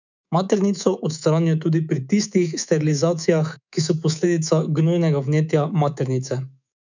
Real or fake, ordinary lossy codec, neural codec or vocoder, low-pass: fake; none; codec, 24 kHz, 3.1 kbps, DualCodec; 7.2 kHz